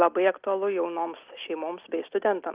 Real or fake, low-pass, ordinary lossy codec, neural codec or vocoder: real; 3.6 kHz; Opus, 24 kbps; none